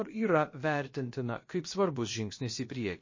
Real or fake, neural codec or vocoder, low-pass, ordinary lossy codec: fake; codec, 16 kHz, about 1 kbps, DyCAST, with the encoder's durations; 7.2 kHz; MP3, 32 kbps